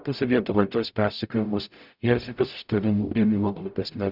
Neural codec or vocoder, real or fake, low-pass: codec, 44.1 kHz, 0.9 kbps, DAC; fake; 5.4 kHz